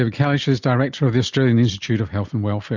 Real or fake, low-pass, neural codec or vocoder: real; 7.2 kHz; none